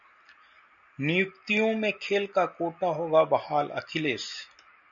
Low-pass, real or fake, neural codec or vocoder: 7.2 kHz; real; none